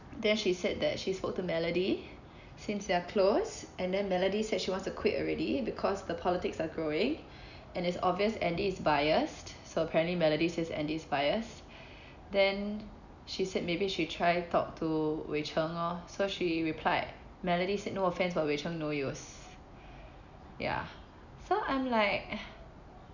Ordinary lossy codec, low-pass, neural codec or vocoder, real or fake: none; 7.2 kHz; none; real